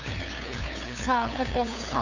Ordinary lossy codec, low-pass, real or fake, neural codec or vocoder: Opus, 64 kbps; 7.2 kHz; fake; codec, 16 kHz, 2 kbps, FunCodec, trained on LibriTTS, 25 frames a second